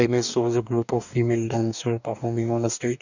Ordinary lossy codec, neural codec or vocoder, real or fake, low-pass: none; codec, 44.1 kHz, 2.6 kbps, DAC; fake; 7.2 kHz